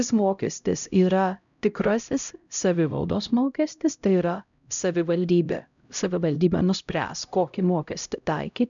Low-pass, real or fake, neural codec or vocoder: 7.2 kHz; fake; codec, 16 kHz, 0.5 kbps, X-Codec, HuBERT features, trained on LibriSpeech